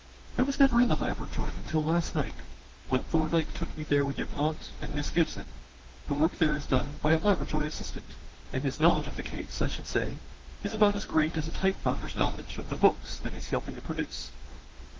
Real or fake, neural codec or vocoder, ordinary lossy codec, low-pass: fake; autoencoder, 48 kHz, 32 numbers a frame, DAC-VAE, trained on Japanese speech; Opus, 16 kbps; 7.2 kHz